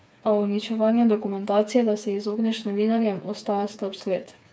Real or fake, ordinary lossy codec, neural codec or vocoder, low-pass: fake; none; codec, 16 kHz, 4 kbps, FreqCodec, smaller model; none